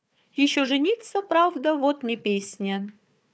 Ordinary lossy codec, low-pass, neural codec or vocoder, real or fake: none; none; codec, 16 kHz, 4 kbps, FunCodec, trained on Chinese and English, 50 frames a second; fake